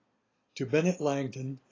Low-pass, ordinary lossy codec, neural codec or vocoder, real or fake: 7.2 kHz; AAC, 32 kbps; none; real